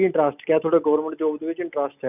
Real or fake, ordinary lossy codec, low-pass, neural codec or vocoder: real; none; 3.6 kHz; none